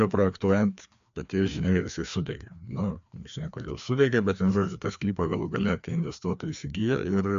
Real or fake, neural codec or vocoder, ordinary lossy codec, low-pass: fake; codec, 16 kHz, 2 kbps, FreqCodec, larger model; MP3, 64 kbps; 7.2 kHz